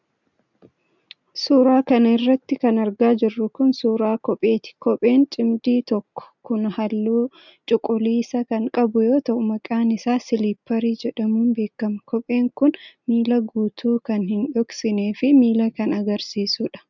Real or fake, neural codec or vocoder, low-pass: real; none; 7.2 kHz